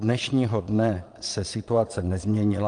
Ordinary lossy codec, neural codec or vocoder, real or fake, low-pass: Opus, 32 kbps; vocoder, 22.05 kHz, 80 mel bands, Vocos; fake; 9.9 kHz